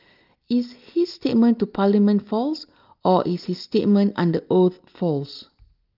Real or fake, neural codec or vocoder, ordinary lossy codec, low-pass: real; none; Opus, 24 kbps; 5.4 kHz